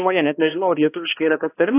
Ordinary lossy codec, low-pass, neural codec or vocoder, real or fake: AAC, 24 kbps; 3.6 kHz; codec, 16 kHz, 1 kbps, X-Codec, HuBERT features, trained on balanced general audio; fake